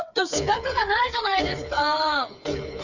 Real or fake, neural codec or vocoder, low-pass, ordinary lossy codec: fake; codec, 16 kHz, 4 kbps, FreqCodec, smaller model; 7.2 kHz; none